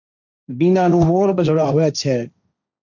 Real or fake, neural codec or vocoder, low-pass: fake; codec, 16 kHz, 1.1 kbps, Voila-Tokenizer; 7.2 kHz